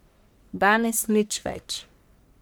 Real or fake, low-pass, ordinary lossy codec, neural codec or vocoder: fake; none; none; codec, 44.1 kHz, 1.7 kbps, Pupu-Codec